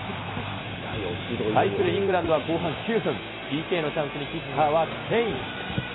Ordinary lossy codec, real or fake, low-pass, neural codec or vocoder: AAC, 16 kbps; real; 7.2 kHz; none